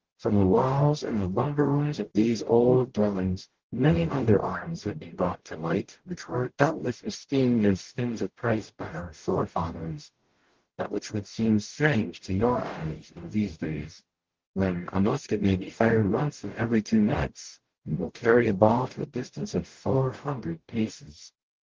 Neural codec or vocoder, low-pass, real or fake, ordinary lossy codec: codec, 44.1 kHz, 0.9 kbps, DAC; 7.2 kHz; fake; Opus, 16 kbps